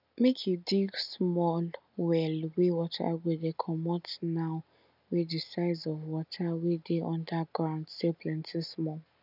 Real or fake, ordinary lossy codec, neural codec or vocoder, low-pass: real; none; none; 5.4 kHz